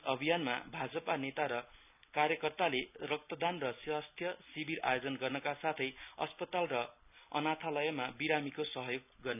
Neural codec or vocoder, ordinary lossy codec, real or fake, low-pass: none; none; real; 3.6 kHz